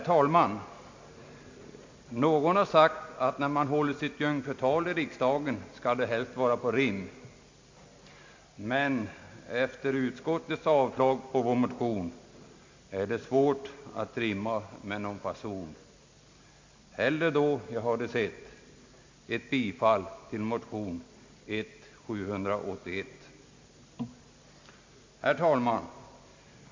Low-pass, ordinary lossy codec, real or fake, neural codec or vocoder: 7.2 kHz; MP3, 48 kbps; real; none